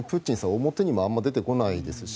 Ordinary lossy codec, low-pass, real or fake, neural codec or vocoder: none; none; real; none